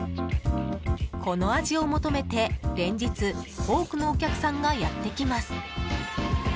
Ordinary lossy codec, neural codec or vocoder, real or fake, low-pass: none; none; real; none